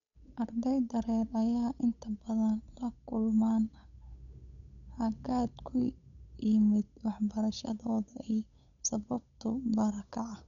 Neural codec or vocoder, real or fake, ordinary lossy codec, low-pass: codec, 16 kHz, 8 kbps, FunCodec, trained on Chinese and English, 25 frames a second; fake; none; 7.2 kHz